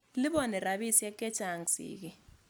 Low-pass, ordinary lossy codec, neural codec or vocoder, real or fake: none; none; none; real